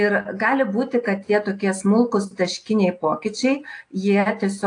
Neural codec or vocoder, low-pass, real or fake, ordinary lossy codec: none; 9.9 kHz; real; AAC, 48 kbps